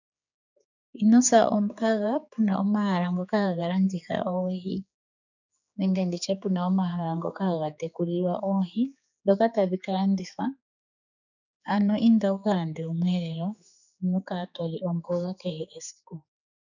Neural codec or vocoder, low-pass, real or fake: codec, 16 kHz, 4 kbps, X-Codec, HuBERT features, trained on general audio; 7.2 kHz; fake